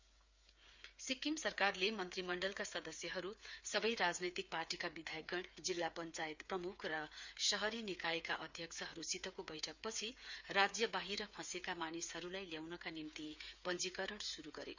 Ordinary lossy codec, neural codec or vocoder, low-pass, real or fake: Opus, 64 kbps; codec, 16 kHz, 8 kbps, FreqCodec, smaller model; 7.2 kHz; fake